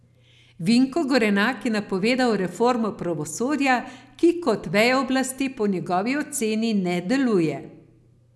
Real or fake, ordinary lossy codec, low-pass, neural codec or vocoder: real; none; none; none